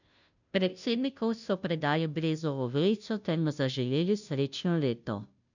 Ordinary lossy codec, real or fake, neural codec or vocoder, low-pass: none; fake; codec, 16 kHz, 0.5 kbps, FunCodec, trained on Chinese and English, 25 frames a second; 7.2 kHz